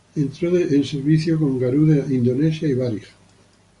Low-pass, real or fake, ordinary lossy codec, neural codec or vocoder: 10.8 kHz; real; Opus, 64 kbps; none